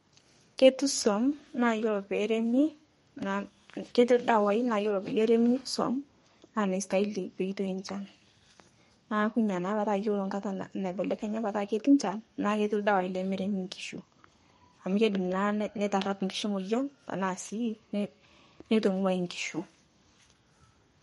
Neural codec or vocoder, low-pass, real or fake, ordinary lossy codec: codec, 32 kHz, 1.9 kbps, SNAC; 14.4 kHz; fake; MP3, 48 kbps